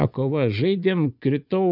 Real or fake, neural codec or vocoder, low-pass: fake; vocoder, 24 kHz, 100 mel bands, Vocos; 5.4 kHz